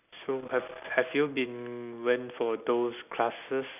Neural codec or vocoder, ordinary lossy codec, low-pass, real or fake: none; none; 3.6 kHz; real